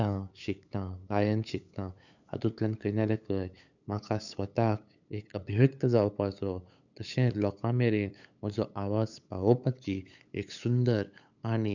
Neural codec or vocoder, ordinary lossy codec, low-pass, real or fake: codec, 16 kHz, 8 kbps, FunCodec, trained on LibriTTS, 25 frames a second; none; 7.2 kHz; fake